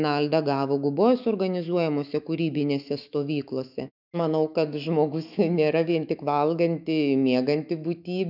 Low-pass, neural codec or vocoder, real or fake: 5.4 kHz; autoencoder, 48 kHz, 128 numbers a frame, DAC-VAE, trained on Japanese speech; fake